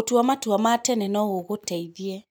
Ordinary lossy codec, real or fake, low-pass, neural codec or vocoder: none; real; none; none